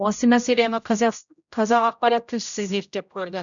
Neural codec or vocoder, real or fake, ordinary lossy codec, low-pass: codec, 16 kHz, 0.5 kbps, X-Codec, HuBERT features, trained on general audio; fake; none; 7.2 kHz